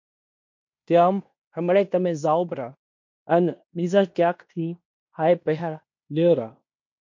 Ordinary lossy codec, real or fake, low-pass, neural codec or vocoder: MP3, 48 kbps; fake; 7.2 kHz; codec, 16 kHz in and 24 kHz out, 0.9 kbps, LongCat-Audio-Codec, fine tuned four codebook decoder